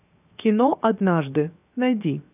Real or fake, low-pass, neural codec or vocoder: fake; 3.6 kHz; codec, 16 kHz, 0.7 kbps, FocalCodec